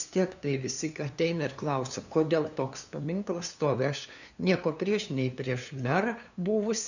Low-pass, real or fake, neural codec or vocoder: 7.2 kHz; fake; codec, 16 kHz, 2 kbps, FunCodec, trained on LibriTTS, 25 frames a second